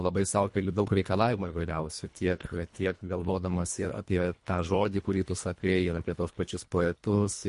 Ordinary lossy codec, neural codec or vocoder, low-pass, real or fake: MP3, 48 kbps; codec, 24 kHz, 1.5 kbps, HILCodec; 10.8 kHz; fake